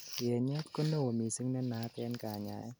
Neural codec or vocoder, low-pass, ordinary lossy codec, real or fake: none; none; none; real